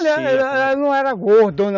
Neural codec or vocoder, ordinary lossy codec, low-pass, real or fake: none; none; 7.2 kHz; real